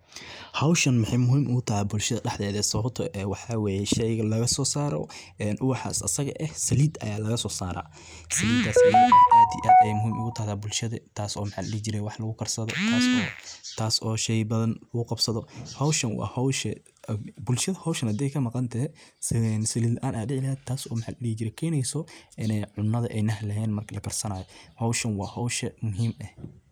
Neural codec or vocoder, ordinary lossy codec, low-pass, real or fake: none; none; none; real